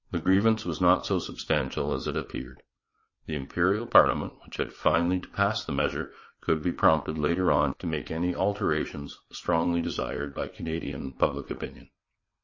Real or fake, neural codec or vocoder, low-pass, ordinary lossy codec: fake; vocoder, 22.05 kHz, 80 mel bands, WaveNeXt; 7.2 kHz; MP3, 32 kbps